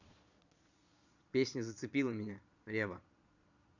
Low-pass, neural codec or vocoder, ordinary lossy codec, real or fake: 7.2 kHz; vocoder, 22.05 kHz, 80 mel bands, Vocos; none; fake